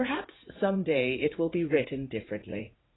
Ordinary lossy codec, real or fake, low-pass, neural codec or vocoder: AAC, 16 kbps; fake; 7.2 kHz; vocoder, 44.1 kHz, 80 mel bands, Vocos